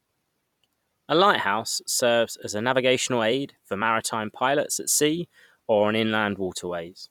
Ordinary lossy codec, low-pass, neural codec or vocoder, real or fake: none; 19.8 kHz; vocoder, 44.1 kHz, 128 mel bands every 256 samples, BigVGAN v2; fake